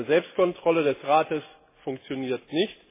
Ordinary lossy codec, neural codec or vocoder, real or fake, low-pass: MP3, 16 kbps; none; real; 3.6 kHz